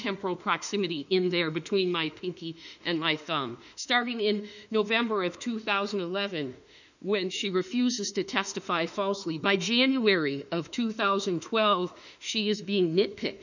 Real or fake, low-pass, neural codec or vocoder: fake; 7.2 kHz; autoencoder, 48 kHz, 32 numbers a frame, DAC-VAE, trained on Japanese speech